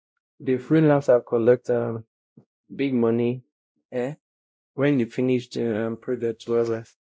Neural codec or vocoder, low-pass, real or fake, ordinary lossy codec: codec, 16 kHz, 0.5 kbps, X-Codec, WavLM features, trained on Multilingual LibriSpeech; none; fake; none